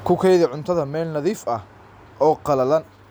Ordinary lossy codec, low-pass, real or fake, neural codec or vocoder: none; none; real; none